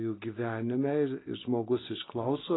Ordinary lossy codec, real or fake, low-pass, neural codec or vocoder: AAC, 16 kbps; real; 7.2 kHz; none